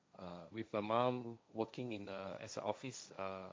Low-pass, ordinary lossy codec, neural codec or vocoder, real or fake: none; none; codec, 16 kHz, 1.1 kbps, Voila-Tokenizer; fake